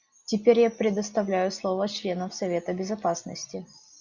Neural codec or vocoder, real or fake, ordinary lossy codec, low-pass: none; real; Opus, 64 kbps; 7.2 kHz